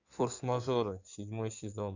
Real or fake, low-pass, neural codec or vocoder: fake; 7.2 kHz; codec, 16 kHz in and 24 kHz out, 2.2 kbps, FireRedTTS-2 codec